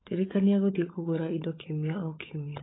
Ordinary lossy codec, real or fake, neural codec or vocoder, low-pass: AAC, 16 kbps; fake; codec, 16 kHz, 16 kbps, FunCodec, trained on LibriTTS, 50 frames a second; 7.2 kHz